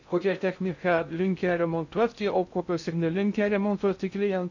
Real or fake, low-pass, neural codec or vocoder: fake; 7.2 kHz; codec, 16 kHz in and 24 kHz out, 0.6 kbps, FocalCodec, streaming, 2048 codes